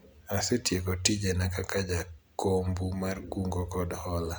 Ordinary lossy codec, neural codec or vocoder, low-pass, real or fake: none; none; none; real